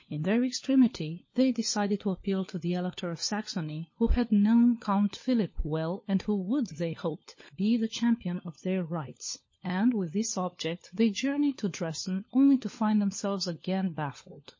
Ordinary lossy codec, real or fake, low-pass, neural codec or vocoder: MP3, 32 kbps; fake; 7.2 kHz; codec, 16 kHz, 4 kbps, FunCodec, trained on LibriTTS, 50 frames a second